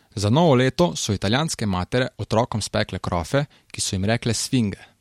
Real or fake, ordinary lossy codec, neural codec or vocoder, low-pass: real; MP3, 64 kbps; none; 19.8 kHz